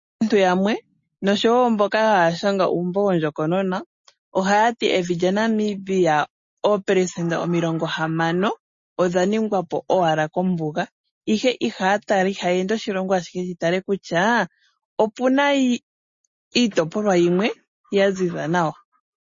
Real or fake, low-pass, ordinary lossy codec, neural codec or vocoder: real; 7.2 kHz; MP3, 32 kbps; none